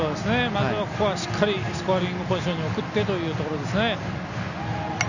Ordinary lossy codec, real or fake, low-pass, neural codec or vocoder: none; real; 7.2 kHz; none